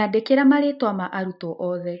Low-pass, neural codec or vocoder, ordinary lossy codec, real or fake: 5.4 kHz; none; none; real